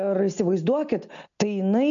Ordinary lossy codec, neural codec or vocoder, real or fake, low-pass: AAC, 64 kbps; none; real; 7.2 kHz